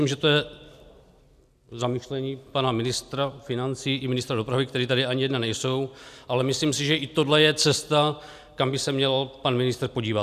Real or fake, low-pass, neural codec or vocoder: real; 14.4 kHz; none